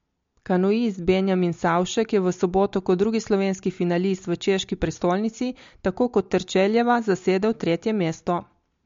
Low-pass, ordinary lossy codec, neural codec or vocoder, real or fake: 7.2 kHz; MP3, 48 kbps; none; real